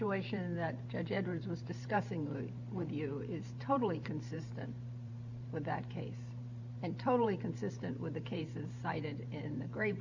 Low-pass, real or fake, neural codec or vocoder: 7.2 kHz; fake; vocoder, 44.1 kHz, 128 mel bands every 512 samples, BigVGAN v2